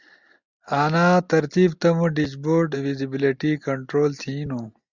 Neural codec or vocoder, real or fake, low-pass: none; real; 7.2 kHz